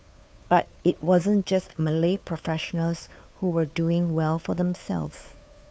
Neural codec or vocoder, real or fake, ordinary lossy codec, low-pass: codec, 16 kHz, 2 kbps, FunCodec, trained on Chinese and English, 25 frames a second; fake; none; none